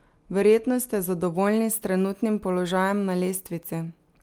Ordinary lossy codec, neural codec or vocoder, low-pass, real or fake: Opus, 32 kbps; none; 19.8 kHz; real